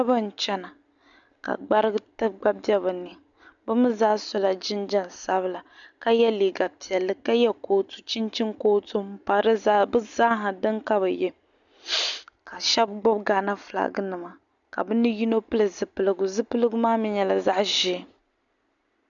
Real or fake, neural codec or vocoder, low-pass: real; none; 7.2 kHz